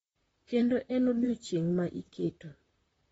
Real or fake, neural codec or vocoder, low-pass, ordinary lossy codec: fake; vocoder, 44.1 kHz, 128 mel bands, Pupu-Vocoder; 19.8 kHz; AAC, 24 kbps